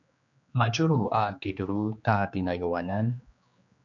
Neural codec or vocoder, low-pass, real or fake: codec, 16 kHz, 2 kbps, X-Codec, HuBERT features, trained on general audio; 7.2 kHz; fake